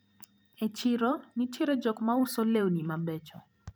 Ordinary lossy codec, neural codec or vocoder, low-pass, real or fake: none; vocoder, 44.1 kHz, 128 mel bands every 512 samples, BigVGAN v2; none; fake